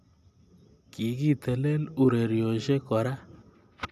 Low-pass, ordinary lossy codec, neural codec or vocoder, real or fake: 14.4 kHz; none; none; real